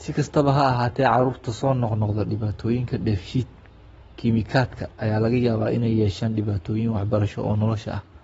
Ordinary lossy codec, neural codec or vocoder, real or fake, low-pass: AAC, 24 kbps; codec, 44.1 kHz, 7.8 kbps, Pupu-Codec; fake; 19.8 kHz